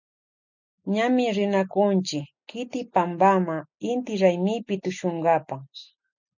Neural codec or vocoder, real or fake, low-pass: none; real; 7.2 kHz